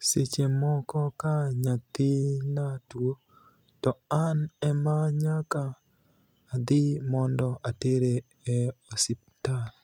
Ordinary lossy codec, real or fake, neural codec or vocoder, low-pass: none; real; none; 19.8 kHz